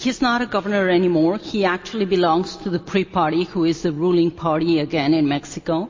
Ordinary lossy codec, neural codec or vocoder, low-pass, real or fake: MP3, 32 kbps; none; 7.2 kHz; real